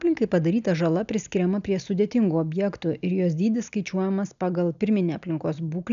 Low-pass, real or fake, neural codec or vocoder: 7.2 kHz; real; none